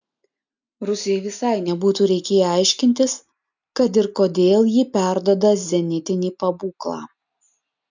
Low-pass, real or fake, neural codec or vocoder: 7.2 kHz; real; none